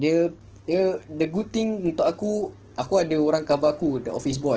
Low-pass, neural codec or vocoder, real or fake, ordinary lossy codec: 7.2 kHz; codec, 44.1 kHz, 7.8 kbps, DAC; fake; Opus, 16 kbps